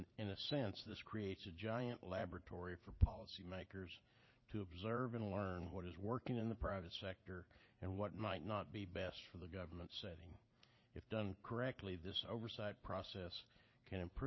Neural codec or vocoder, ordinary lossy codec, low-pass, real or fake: vocoder, 44.1 kHz, 80 mel bands, Vocos; MP3, 24 kbps; 7.2 kHz; fake